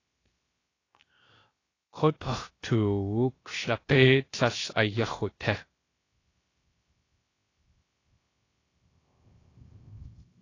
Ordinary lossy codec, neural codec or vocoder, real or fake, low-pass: AAC, 32 kbps; codec, 16 kHz, 0.3 kbps, FocalCodec; fake; 7.2 kHz